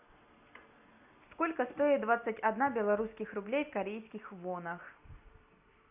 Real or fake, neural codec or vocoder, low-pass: real; none; 3.6 kHz